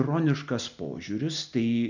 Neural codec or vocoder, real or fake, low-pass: none; real; 7.2 kHz